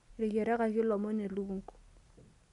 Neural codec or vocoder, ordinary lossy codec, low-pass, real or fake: none; MP3, 96 kbps; 10.8 kHz; real